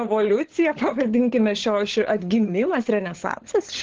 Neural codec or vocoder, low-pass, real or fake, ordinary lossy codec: codec, 16 kHz, 16 kbps, FunCodec, trained on LibriTTS, 50 frames a second; 7.2 kHz; fake; Opus, 16 kbps